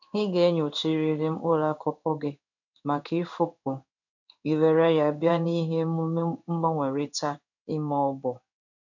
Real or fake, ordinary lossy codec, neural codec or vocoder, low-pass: fake; none; codec, 16 kHz in and 24 kHz out, 1 kbps, XY-Tokenizer; 7.2 kHz